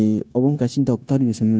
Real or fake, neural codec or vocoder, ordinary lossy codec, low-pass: fake; codec, 16 kHz, 0.9 kbps, LongCat-Audio-Codec; none; none